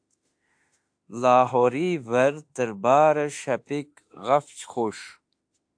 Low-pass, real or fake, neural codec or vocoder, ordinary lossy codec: 9.9 kHz; fake; autoencoder, 48 kHz, 32 numbers a frame, DAC-VAE, trained on Japanese speech; AAC, 64 kbps